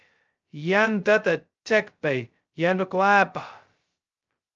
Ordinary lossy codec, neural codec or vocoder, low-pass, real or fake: Opus, 24 kbps; codec, 16 kHz, 0.2 kbps, FocalCodec; 7.2 kHz; fake